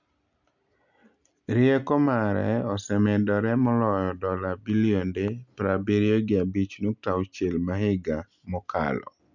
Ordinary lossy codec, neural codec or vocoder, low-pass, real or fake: none; none; 7.2 kHz; real